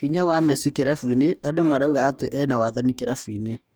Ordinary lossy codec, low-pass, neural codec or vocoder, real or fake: none; none; codec, 44.1 kHz, 2.6 kbps, DAC; fake